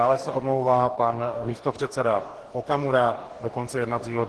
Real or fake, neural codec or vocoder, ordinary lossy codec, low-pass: fake; codec, 44.1 kHz, 2.6 kbps, DAC; Opus, 16 kbps; 10.8 kHz